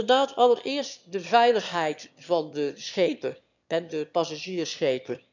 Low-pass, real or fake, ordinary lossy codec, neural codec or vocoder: 7.2 kHz; fake; none; autoencoder, 22.05 kHz, a latent of 192 numbers a frame, VITS, trained on one speaker